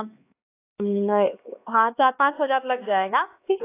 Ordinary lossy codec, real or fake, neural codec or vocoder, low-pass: AAC, 24 kbps; fake; codec, 16 kHz, 2 kbps, X-Codec, HuBERT features, trained on LibriSpeech; 3.6 kHz